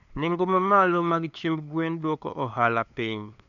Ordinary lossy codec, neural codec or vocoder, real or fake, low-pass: none; codec, 16 kHz, 2 kbps, FunCodec, trained on LibriTTS, 25 frames a second; fake; 7.2 kHz